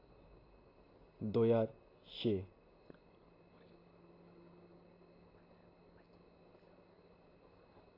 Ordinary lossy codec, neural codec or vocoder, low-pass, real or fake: AAC, 24 kbps; none; 5.4 kHz; real